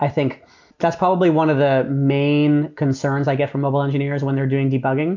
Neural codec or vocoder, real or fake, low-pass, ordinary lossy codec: none; real; 7.2 kHz; MP3, 64 kbps